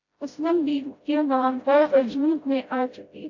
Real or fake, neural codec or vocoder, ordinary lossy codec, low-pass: fake; codec, 16 kHz, 0.5 kbps, FreqCodec, smaller model; AAC, 48 kbps; 7.2 kHz